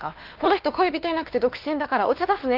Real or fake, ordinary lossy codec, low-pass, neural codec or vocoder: fake; Opus, 32 kbps; 5.4 kHz; codec, 16 kHz, 2 kbps, X-Codec, WavLM features, trained on Multilingual LibriSpeech